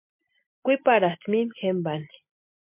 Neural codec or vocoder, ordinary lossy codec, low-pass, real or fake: none; MP3, 32 kbps; 3.6 kHz; real